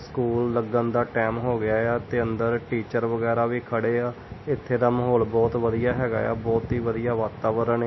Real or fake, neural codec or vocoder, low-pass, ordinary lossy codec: real; none; 7.2 kHz; MP3, 24 kbps